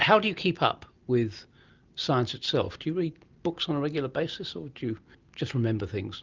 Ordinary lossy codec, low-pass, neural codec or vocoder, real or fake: Opus, 32 kbps; 7.2 kHz; none; real